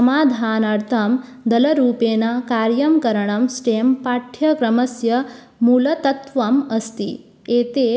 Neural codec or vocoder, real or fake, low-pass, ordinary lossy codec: none; real; none; none